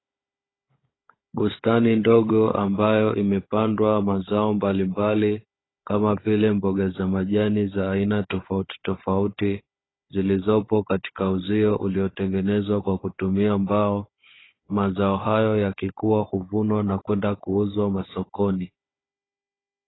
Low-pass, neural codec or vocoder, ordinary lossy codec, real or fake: 7.2 kHz; codec, 16 kHz, 16 kbps, FunCodec, trained on Chinese and English, 50 frames a second; AAC, 16 kbps; fake